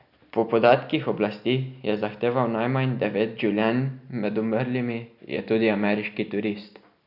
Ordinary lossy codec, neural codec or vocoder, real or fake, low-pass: none; none; real; 5.4 kHz